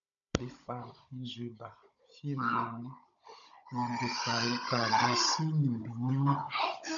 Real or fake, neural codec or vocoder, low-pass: fake; codec, 16 kHz, 16 kbps, FunCodec, trained on Chinese and English, 50 frames a second; 7.2 kHz